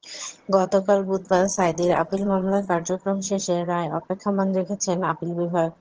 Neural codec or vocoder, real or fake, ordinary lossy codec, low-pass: vocoder, 22.05 kHz, 80 mel bands, HiFi-GAN; fake; Opus, 16 kbps; 7.2 kHz